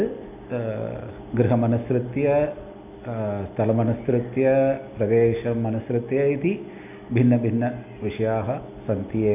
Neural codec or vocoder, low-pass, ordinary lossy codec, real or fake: none; 3.6 kHz; none; real